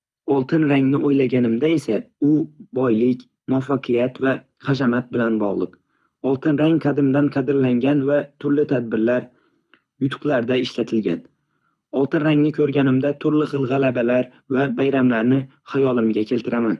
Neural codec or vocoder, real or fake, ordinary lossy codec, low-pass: codec, 24 kHz, 6 kbps, HILCodec; fake; none; none